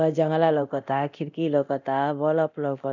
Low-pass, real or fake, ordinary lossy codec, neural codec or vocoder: 7.2 kHz; fake; none; codec, 24 kHz, 0.9 kbps, DualCodec